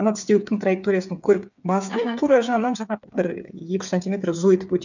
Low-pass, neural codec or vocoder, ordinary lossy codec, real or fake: 7.2 kHz; codec, 16 kHz, 8 kbps, FreqCodec, smaller model; none; fake